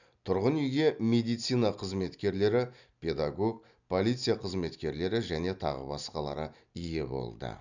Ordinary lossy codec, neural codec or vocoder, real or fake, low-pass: none; none; real; 7.2 kHz